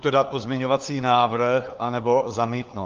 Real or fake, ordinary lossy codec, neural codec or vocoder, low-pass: fake; Opus, 32 kbps; codec, 16 kHz, 4 kbps, FunCodec, trained on LibriTTS, 50 frames a second; 7.2 kHz